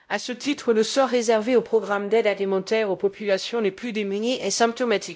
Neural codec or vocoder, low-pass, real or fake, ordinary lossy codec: codec, 16 kHz, 0.5 kbps, X-Codec, WavLM features, trained on Multilingual LibriSpeech; none; fake; none